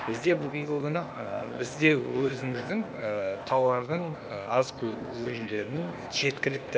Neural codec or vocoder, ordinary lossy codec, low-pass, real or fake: codec, 16 kHz, 0.8 kbps, ZipCodec; none; none; fake